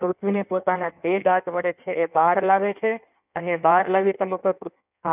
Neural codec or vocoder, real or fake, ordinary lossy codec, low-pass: codec, 16 kHz in and 24 kHz out, 1.1 kbps, FireRedTTS-2 codec; fake; none; 3.6 kHz